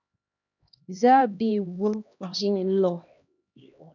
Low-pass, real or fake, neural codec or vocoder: 7.2 kHz; fake; codec, 16 kHz, 1 kbps, X-Codec, HuBERT features, trained on LibriSpeech